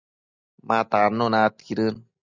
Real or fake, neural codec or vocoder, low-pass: real; none; 7.2 kHz